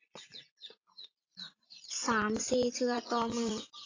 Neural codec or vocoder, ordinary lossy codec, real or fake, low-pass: vocoder, 44.1 kHz, 80 mel bands, Vocos; AAC, 32 kbps; fake; 7.2 kHz